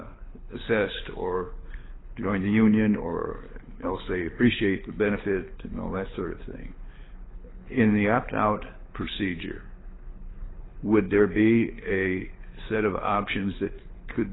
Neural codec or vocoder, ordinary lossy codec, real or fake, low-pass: codec, 24 kHz, 6 kbps, HILCodec; AAC, 16 kbps; fake; 7.2 kHz